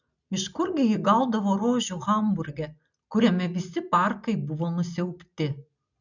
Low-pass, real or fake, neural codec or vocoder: 7.2 kHz; real; none